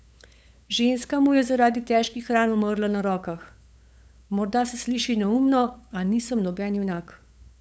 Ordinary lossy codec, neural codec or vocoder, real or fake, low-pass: none; codec, 16 kHz, 8 kbps, FunCodec, trained on LibriTTS, 25 frames a second; fake; none